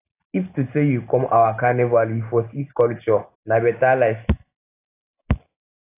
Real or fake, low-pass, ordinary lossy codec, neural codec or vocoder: real; 3.6 kHz; AAC, 24 kbps; none